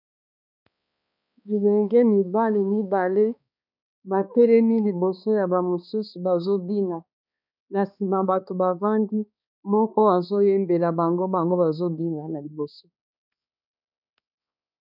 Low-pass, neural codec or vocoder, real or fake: 5.4 kHz; codec, 16 kHz, 2 kbps, X-Codec, HuBERT features, trained on balanced general audio; fake